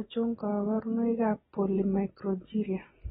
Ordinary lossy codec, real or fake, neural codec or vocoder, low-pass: AAC, 16 kbps; real; none; 19.8 kHz